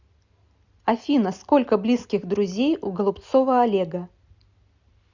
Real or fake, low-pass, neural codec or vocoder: real; 7.2 kHz; none